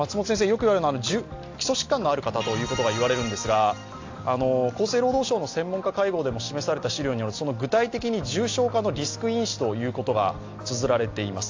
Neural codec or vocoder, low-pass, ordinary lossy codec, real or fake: none; 7.2 kHz; AAC, 48 kbps; real